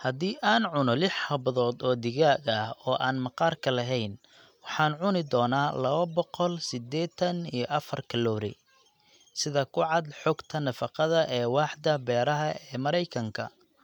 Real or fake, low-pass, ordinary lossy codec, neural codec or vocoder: real; 19.8 kHz; none; none